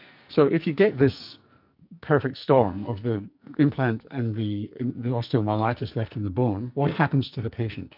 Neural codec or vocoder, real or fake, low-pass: codec, 44.1 kHz, 2.6 kbps, SNAC; fake; 5.4 kHz